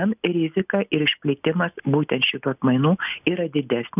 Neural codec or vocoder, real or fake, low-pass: none; real; 3.6 kHz